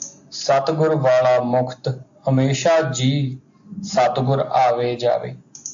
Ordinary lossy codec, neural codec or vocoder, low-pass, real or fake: AAC, 64 kbps; none; 7.2 kHz; real